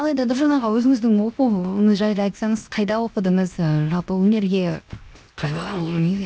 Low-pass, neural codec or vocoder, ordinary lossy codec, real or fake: none; codec, 16 kHz, 0.7 kbps, FocalCodec; none; fake